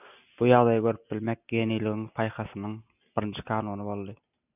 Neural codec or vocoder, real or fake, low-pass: none; real; 3.6 kHz